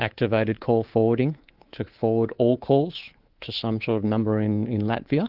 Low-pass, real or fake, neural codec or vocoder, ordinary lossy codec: 5.4 kHz; fake; codec, 24 kHz, 3.1 kbps, DualCodec; Opus, 16 kbps